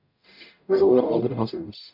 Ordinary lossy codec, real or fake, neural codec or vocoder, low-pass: MP3, 48 kbps; fake; codec, 44.1 kHz, 0.9 kbps, DAC; 5.4 kHz